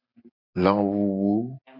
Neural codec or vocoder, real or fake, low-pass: none; real; 5.4 kHz